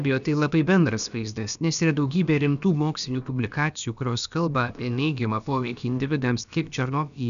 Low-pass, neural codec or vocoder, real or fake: 7.2 kHz; codec, 16 kHz, about 1 kbps, DyCAST, with the encoder's durations; fake